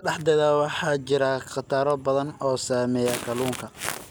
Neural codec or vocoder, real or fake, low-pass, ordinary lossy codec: none; real; none; none